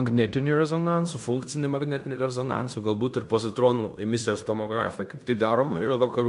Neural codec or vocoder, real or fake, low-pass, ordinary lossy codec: codec, 16 kHz in and 24 kHz out, 0.9 kbps, LongCat-Audio-Codec, fine tuned four codebook decoder; fake; 10.8 kHz; MP3, 64 kbps